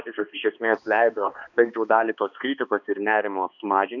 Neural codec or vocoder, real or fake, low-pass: codec, 16 kHz, 2 kbps, X-Codec, HuBERT features, trained on balanced general audio; fake; 7.2 kHz